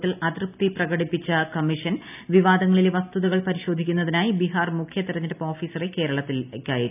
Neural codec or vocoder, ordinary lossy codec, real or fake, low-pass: none; none; real; 3.6 kHz